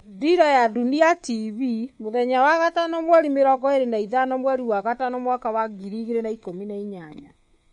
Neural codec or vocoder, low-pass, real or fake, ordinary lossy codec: codec, 24 kHz, 3.1 kbps, DualCodec; 10.8 kHz; fake; MP3, 48 kbps